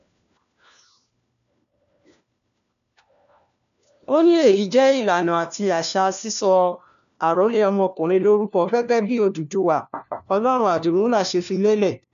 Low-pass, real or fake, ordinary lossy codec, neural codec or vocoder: 7.2 kHz; fake; none; codec, 16 kHz, 1 kbps, FunCodec, trained on LibriTTS, 50 frames a second